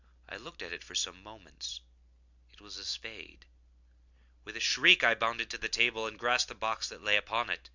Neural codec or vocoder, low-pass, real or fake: none; 7.2 kHz; real